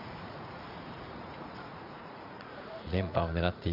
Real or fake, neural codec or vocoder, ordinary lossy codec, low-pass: fake; vocoder, 44.1 kHz, 80 mel bands, Vocos; none; 5.4 kHz